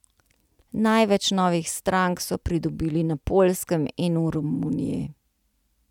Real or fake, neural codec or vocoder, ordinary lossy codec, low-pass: real; none; none; 19.8 kHz